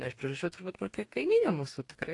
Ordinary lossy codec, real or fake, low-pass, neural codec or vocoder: AAC, 48 kbps; fake; 10.8 kHz; codec, 44.1 kHz, 2.6 kbps, DAC